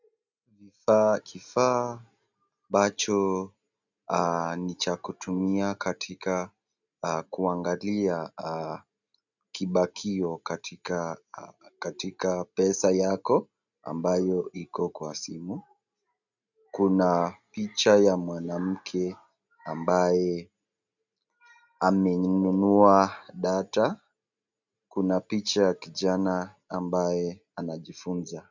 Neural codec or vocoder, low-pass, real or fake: none; 7.2 kHz; real